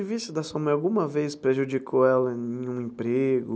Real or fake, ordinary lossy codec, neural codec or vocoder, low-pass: real; none; none; none